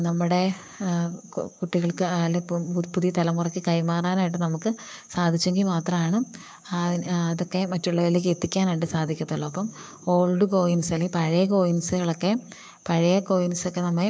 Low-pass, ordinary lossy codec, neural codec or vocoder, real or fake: none; none; codec, 16 kHz, 6 kbps, DAC; fake